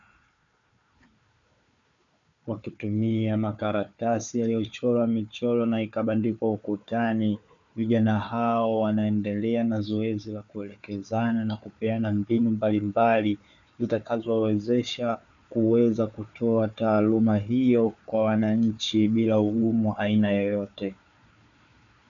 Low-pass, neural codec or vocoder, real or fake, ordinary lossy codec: 7.2 kHz; codec, 16 kHz, 4 kbps, FunCodec, trained on Chinese and English, 50 frames a second; fake; MP3, 96 kbps